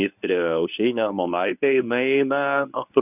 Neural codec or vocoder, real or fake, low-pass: codec, 24 kHz, 0.9 kbps, WavTokenizer, medium speech release version 1; fake; 3.6 kHz